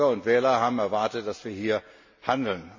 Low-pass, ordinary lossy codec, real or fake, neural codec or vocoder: 7.2 kHz; MP3, 32 kbps; real; none